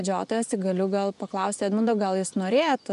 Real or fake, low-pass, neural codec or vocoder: fake; 10.8 kHz; vocoder, 24 kHz, 100 mel bands, Vocos